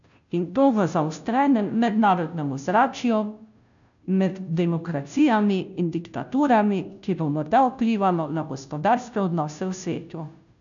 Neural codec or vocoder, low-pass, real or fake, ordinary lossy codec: codec, 16 kHz, 0.5 kbps, FunCodec, trained on Chinese and English, 25 frames a second; 7.2 kHz; fake; none